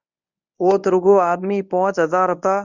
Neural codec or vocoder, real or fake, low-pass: codec, 24 kHz, 0.9 kbps, WavTokenizer, medium speech release version 1; fake; 7.2 kHz